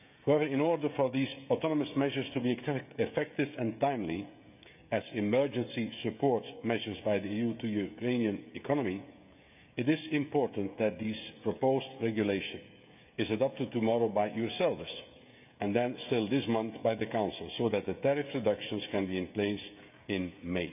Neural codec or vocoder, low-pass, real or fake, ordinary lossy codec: codec, 16 kHz, 16 kbps, FreqCodec, smaller model; 3.6 kHz; fake; none